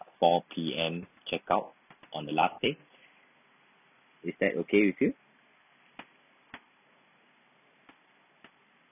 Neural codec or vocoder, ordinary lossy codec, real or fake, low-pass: none; none; real; 3.6 kHz